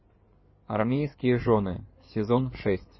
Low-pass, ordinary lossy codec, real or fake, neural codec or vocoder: 7.2 kHz; MP3, 24 kbps; fake; codec, 16 kHz in and 24 kHz out, 2.2 kbps, FireRedTTS-2 codec